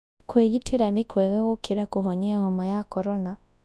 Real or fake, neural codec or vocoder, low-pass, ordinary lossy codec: fake; codec, 24 kHz, 0.9 kbps, WavTokenizer, large speech release; none; none